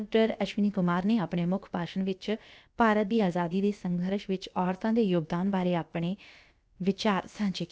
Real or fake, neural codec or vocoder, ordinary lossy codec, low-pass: fake; codec, 16 kHz, about 1 kbps, DyCAST, with the encoder's durations; none; none